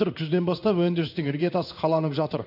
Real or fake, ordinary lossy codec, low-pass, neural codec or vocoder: fake; none; 5.4 kHz; codec, 16 kHz in and 24 kHz out, 1 kbps, XY-Tokenizer